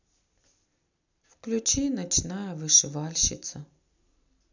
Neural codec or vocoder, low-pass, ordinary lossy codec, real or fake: none; 7.2 kHz; none; real